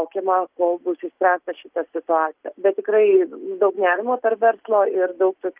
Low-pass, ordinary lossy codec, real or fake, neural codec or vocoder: 3.6 kHz; Opus, 32 kbps; real; none